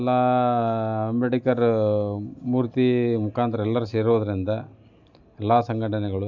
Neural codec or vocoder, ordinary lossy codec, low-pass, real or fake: none; none; 7.2 kHz; real